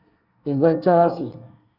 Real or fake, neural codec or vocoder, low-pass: fake; codec, 24 kHz, 1 kbps, SNAC; 5.4 kHz